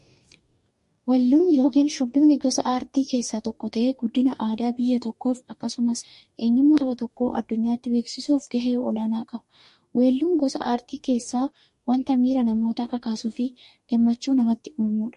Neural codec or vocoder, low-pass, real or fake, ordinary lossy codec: codec, 44.1 kHz, 2.6 kbps, DAC; 14.4 kHz; fake; MP3, 48 kbps